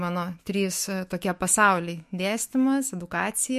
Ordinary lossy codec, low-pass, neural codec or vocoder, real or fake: MP3, 64 kbps; 14.4 kHz; autoencoder, 48 kHz, 128 numbers a frame, DAC-VAE, trained on Japanese speech; fake